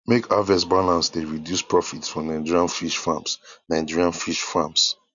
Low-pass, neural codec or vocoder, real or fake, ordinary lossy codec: 7.2 kHz; none; real; none